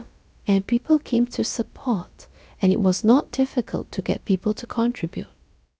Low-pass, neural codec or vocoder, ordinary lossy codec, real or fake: none; codec, 16 kHz, about 1 kbps, DyCAST, with the encoder's durations; none; fake